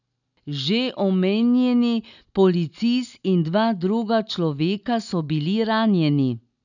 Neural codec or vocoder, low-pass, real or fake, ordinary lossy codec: none; 7.2 kHz; real; none